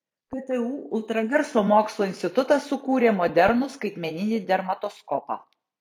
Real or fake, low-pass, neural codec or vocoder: fake; 19.8 kHz; vocoder, 44.1 kHz, 128 mel bands every 256 samples, BigVGAN v2